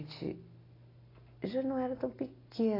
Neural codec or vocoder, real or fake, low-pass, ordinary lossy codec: none; real; 5.4 kHz; AAC, 24 kbps